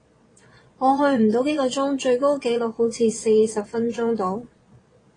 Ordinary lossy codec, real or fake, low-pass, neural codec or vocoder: AAC, 32 kbps; fake; 9.9 kHz; vocoder, 22.05 kHz, 80 mel bands, Vocos